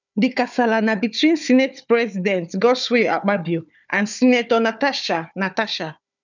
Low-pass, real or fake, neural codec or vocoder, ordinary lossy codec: 7.2 kHz; fake; codec, 16 kHz, 4 kbps, FunCodec, trained on Chinese and English, 50 frames a second; none